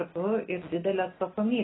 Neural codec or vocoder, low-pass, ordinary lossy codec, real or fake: none; 7.2 kHz; AAC, 16 kbps; real